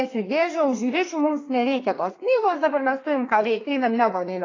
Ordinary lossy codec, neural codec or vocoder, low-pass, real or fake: AAC, 32 kbps; codec, 32 kHz, 1.9 kbps, SNAC; 7.2 kHz; fake